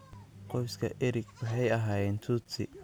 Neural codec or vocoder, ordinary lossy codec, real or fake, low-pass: none; none; real; none